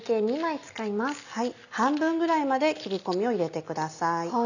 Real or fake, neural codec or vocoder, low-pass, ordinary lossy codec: real; none; 7.2 kHz; none